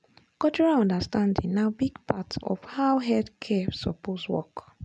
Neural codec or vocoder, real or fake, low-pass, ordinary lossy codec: none; real; none; none